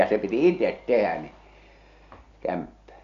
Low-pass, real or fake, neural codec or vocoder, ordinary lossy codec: 7.2 kHz; real; none; AAC, 64 kbps